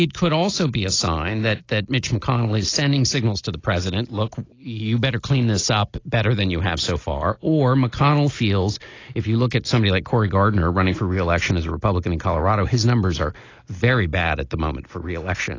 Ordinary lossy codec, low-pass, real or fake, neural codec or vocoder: AAC, 32 kbps; 7.2 kHz; real; none